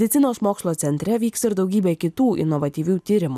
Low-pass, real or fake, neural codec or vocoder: 14.4 kHz; real; none